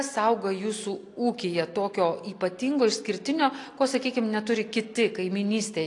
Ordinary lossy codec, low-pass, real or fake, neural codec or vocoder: AAC, 48 kbps; 10.8 kHz; real; none